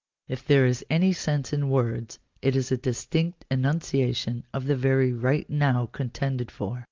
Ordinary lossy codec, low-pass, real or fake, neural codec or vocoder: Opus, 24 kbps; 7.2 kHz; real; none